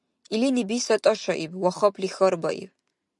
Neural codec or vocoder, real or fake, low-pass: none; real; 10.8 kHz